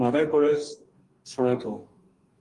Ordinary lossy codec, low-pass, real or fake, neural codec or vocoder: Opus, 24 kbps; 10.8 kHz; fake; codec, 44.1 kHz, 2.6 kbps, DAC